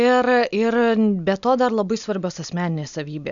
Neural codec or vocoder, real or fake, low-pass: none; real; 7.2 kHz